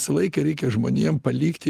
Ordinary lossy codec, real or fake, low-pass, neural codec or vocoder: Opus, 24 kbps; real; 14.4 kHz; none